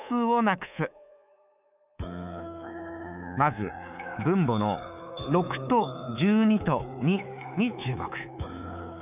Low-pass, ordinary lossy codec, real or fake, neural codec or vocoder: 3.6 kHz; none; fake; codec, 24 kHz, 3.1 kbps, DualCodec